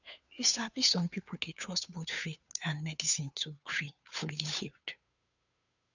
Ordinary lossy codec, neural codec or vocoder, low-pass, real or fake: none; codec, 16 kHz, 2 kbps, FunCodec, trained on Chinese and English, 25 frames a second; 7.2 kHz; fake